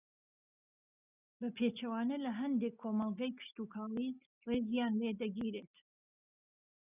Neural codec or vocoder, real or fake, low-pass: none; real; 3.6 kHz